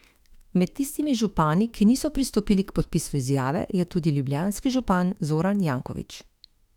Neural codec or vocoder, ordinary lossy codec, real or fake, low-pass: autoencoder, 48 kHz, 32 numbers a frame, DAC-VAE, trained on Japanese speech; none; fake; 19.8 kHz